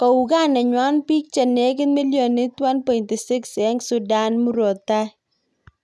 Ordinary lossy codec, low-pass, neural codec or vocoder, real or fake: none; none; none; real